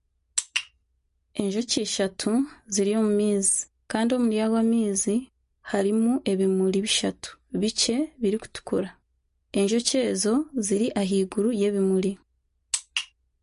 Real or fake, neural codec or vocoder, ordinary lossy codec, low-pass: real; none; MP3, 48 kbps; 10.8 kHz